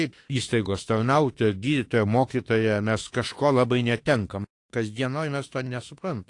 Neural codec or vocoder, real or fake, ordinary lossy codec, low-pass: autoencoder, 48 kHz, 32 numbers a frame, DAC-VAE, trained on Japanese speech; fake; AAC, 48 kbps; 10.8 kHz